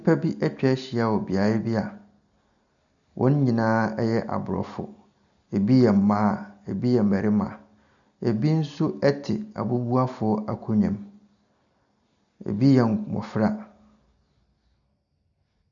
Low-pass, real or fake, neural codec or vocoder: 7.2 kHz; real; none